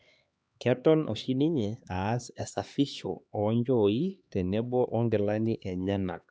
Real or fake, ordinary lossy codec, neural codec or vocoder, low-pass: fake; none; codec, 16 kHz, 2 kbps, X-Codec, HuBERT features, trained on LibriSpeech; none